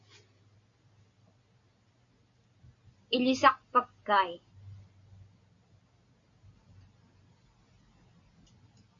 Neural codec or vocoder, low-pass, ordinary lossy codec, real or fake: none; 7.2 kHz; MP3, 64 kbps; real